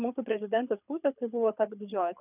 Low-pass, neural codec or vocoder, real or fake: 3.6 kHz; codec, 24 kHz, 6 kbps, HILCodec; fake